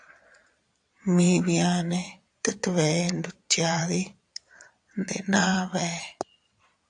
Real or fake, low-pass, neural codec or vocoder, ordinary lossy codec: fake; 9.9 kHz; vocoder, 22.05 kHz, 80 mel bands, Vocos; AAC, 64 kbps